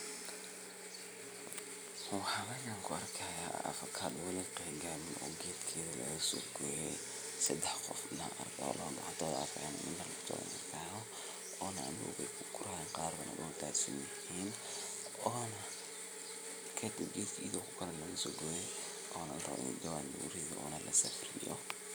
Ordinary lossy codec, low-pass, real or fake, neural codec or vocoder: none; none; real; none